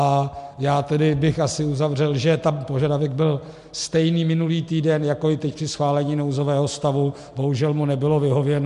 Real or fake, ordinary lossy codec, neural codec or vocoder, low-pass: real; MP3, 64 kbps; none; 10.8 kHz